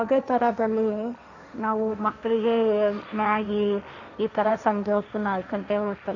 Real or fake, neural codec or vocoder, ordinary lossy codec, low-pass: fake; codec, 16 kHz, 1.1 kbps, Voila-Tokenizer; none; 7.2 kHz